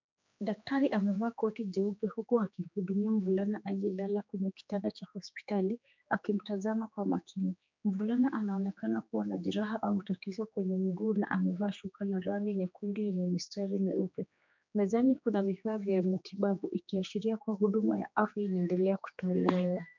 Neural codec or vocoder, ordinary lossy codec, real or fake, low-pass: codec, 16 kHz, 2 kbps, X-Codec, HuBERT features, trained on general audio; MP3, 64 kbps; fake; 7.2 kHz